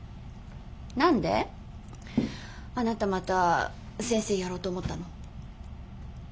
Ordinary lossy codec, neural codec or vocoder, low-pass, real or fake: none; none; none; real